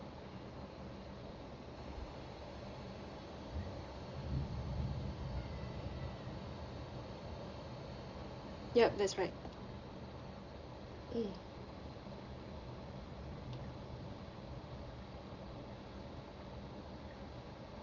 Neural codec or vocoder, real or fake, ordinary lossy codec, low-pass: none; real; Opus, 32 kbps; 7.2 kHz